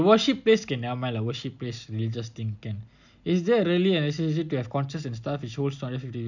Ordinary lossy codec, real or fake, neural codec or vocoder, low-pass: none; real; none; 7.2 kHz